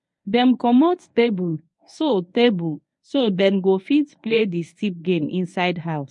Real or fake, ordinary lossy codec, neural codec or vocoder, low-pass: fake; MP3, 48 kbps; codec, 24 kHz, 0.9 kbps, WavTokenizer, medium speech release version 1; 10.8 kHz